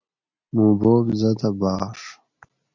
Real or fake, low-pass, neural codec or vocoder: real; 7.2 kHz; none